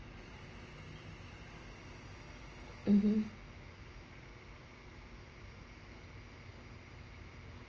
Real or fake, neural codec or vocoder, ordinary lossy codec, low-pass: real; none; Opus, 24 kbps; 7.2 kHz